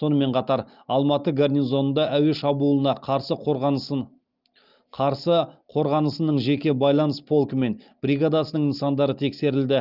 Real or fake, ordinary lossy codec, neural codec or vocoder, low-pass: real; Opus, 24 kbps; none; 5.4 kHz